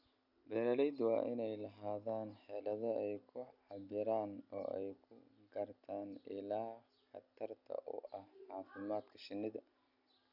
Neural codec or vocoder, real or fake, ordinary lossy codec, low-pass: none; real; none; 5.4 kHz